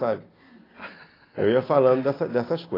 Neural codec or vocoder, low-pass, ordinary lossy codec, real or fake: none; 5.4 kHz; AAC, 24 kbps; real